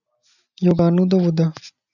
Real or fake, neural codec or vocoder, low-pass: real; none; 7.2 kHz